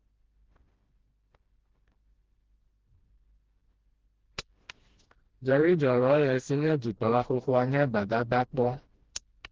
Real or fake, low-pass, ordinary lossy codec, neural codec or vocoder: fake; 7.2 kHz; Opus, 16 kbps; codec, 16 kHz, 1 kbps, FreqCodec, smaller model